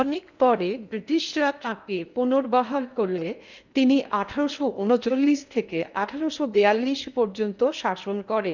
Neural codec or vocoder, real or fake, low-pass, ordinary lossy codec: codec, 16 kHz in and 24 kHz out, 0.8 kbps, FocalCodec, streaming, 65536 codes; fake; 7.2 kHz; none